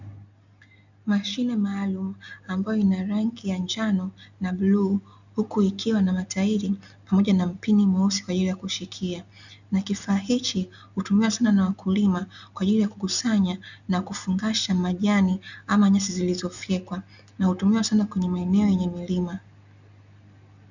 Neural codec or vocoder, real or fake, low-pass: none; real; 7.2 kHz